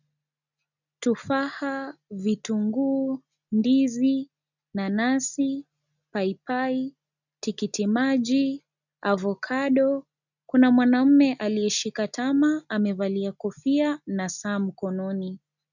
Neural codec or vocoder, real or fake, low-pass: none; real; 7.2 kHz